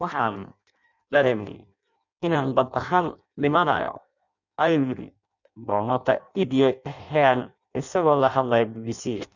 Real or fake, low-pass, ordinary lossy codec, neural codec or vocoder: fake; 7.2 kHz; none; codec, 16 kHz in and 24 kHz out, 0.6 kbps, FireRedTTS-2 codec